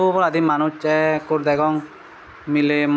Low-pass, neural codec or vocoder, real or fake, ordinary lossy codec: none; none; real; none